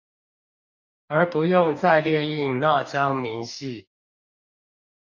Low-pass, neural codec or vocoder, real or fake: 7.2 kHz; codec, 44.1 kHz, 2.6 kbps, DAC; fake